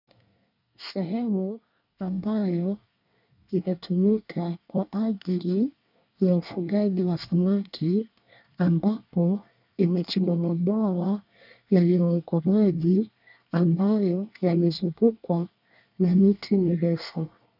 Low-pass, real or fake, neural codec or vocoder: 5.4 kHz; fake; codec, 24 kHz, 1 kbps, SNAC